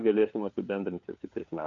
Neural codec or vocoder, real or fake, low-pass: codec, 16 kHz, 4 kbps, FunCodec, trained on LibriTTS, 50 frames a second; fake; 7.2 kHz